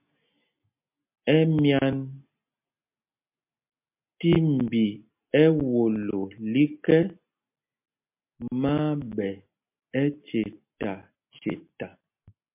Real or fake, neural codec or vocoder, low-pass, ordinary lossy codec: real; none; 3.6 kHz; MP3, 32 kbps